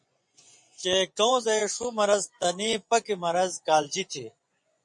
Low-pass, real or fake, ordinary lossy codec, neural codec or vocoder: 10.8 kHz; fake; MP3, 48 kbps; vocoder, 24 kHz, 100 mel bands, Vocos